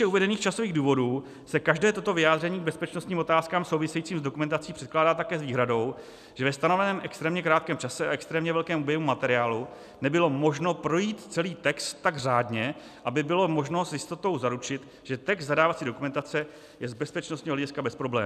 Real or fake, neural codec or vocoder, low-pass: real; none; 14.4 kHz